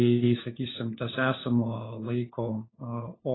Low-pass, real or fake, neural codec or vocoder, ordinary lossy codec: 7.2 kHz; fake; codec, 16 kHz, 4 kbps, FunCodec, trained on LibriTTS, 50 frames a second; AAC, 16 kbps